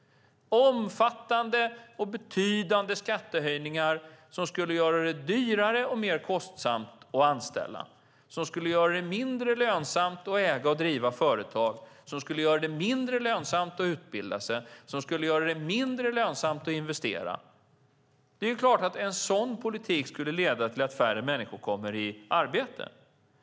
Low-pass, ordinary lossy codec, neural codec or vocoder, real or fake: none; none; none; real